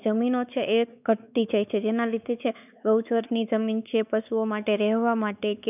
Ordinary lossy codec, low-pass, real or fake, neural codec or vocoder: none; 3.6 kHz; fake; codec, 16 kHz, 4 kbps, X-Codec, WavLM features, trained on Multilingual LibriSpeech